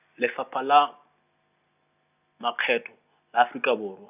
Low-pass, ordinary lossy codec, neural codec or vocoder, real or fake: 3.6 kHz; none; none; real